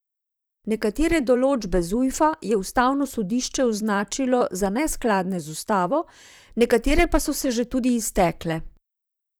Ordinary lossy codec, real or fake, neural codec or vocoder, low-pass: none; fake; vocoder, 44.1 kHz, 128 mel bands, Pupu-Vocoder; none